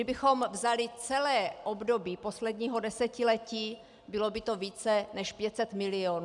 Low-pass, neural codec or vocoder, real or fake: 10.8 kHz; none; real